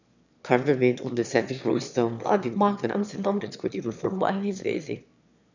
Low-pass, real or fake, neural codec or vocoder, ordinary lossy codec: 7.2 kHz; fake; autoencoder, 22.05 kHz, a latent of 192 numbers a frame, VITS, trained on one speaker; none